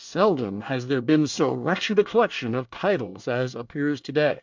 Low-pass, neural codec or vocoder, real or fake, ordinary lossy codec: 7.2 kHz; codec, 24 kHz, 1 kbps, SNAC; fake; MP3, 64 kbps